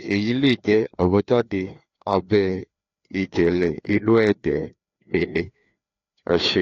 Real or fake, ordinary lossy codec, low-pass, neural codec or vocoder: fake; AAC, 48 kbps; 7.2 kHz; codec, 16 kHz, 2 kbps, FreqCodec, larger model